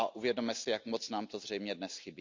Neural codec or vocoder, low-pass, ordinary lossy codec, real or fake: none; 7.2 kHz; MP3, 64 kbps; real